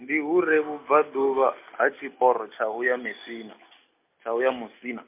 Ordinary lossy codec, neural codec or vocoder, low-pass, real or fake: MP3, 32 kbps; none; 3.6 kHz; real